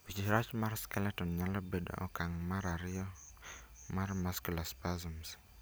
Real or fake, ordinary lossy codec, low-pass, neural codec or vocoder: real; none; none; none